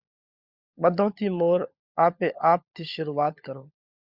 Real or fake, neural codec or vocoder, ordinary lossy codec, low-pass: fake; codec, 16 kHz, 16 kbps, FunCodec, trained on LibriTTS, 50 frames a second; Opus, 64 kbps; 5.4 kHz